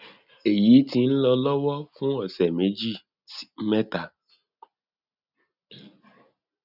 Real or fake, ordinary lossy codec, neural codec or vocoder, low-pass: real; none; none; 5.4 kHz